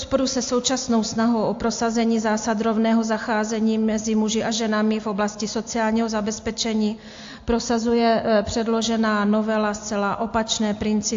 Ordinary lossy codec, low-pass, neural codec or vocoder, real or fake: AAC, 48 kbps; 7.2 kHz; none; real